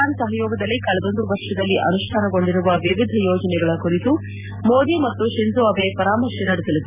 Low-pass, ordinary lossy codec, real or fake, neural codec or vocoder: 3.6 kHz; none; real; none